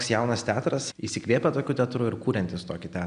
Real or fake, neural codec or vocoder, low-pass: real; none; 9.9 kHz